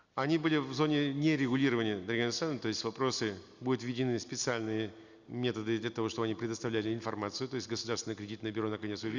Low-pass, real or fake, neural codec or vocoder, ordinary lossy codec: 7.2 kHz; real; none; Opus, 64 kbps